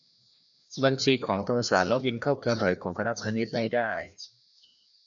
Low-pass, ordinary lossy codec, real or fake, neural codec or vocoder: 7.2 kHz; MP3, 96 kbps; fake; codec, 16 kHz, 1 kbps, FreqCodec, larger model